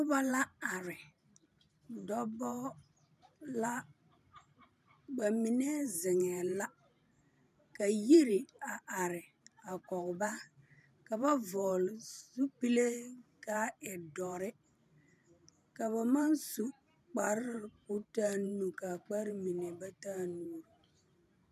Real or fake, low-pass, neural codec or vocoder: fake; 14.4 kHz; vocoder, 44.1 kHz, 128 mel bands every 512 samples, BigVGAN v2